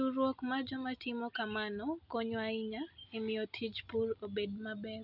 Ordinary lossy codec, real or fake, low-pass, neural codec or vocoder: none; real; 5.4 kHz; none